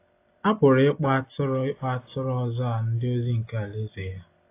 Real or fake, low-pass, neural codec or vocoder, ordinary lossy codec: real; 3.6 kHz; none; AAC, 24 kbps